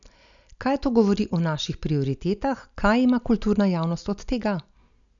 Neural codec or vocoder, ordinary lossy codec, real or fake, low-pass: none; none; real; 7.2 kHz